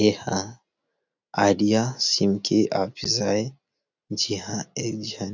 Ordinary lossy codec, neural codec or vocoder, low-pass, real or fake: none; none; 7.2 kHz; real